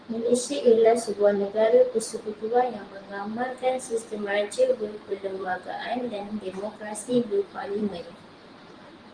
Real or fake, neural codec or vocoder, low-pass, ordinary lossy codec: fake; vocoder, 44.1 kHz, 128 mel bands every 512 samples, BigVGAN v2; 9.9 kHz; Opus, 24 kbps